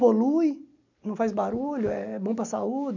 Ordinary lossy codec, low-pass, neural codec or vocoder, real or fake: none; 7.2 kHz; none; real